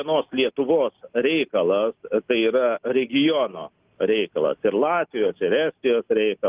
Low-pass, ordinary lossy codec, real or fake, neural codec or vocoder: 3.6 kHz; Opus, 32 kbps; real; none